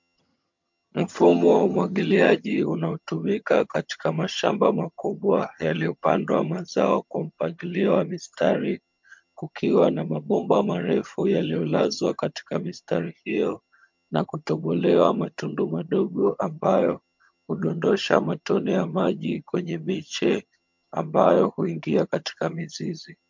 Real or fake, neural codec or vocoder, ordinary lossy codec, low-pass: fake; vocoder, 22.05 kHz, 80 mel bands, HiFi-GAN; MP3, 64 kbps; 7.2 kHz